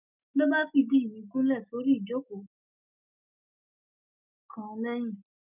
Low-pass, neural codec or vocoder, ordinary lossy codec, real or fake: 3.6 kHz; none; none; real